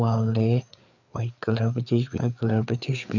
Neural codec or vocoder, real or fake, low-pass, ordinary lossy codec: codec, 16 kHz, 4 kbps, FreqCodec, larger model; fake; 7.2 kHz; none